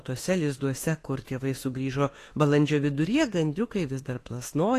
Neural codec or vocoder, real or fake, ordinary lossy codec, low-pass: autoencoder, 48 kHz, 32 numbers a frame, DAC-VAE, trained on Japanese speech; fake; AAC, 48 kbps; 14.4 kHz